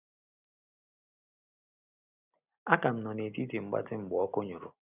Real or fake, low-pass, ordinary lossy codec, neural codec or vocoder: real; 3.6 kHz; none; none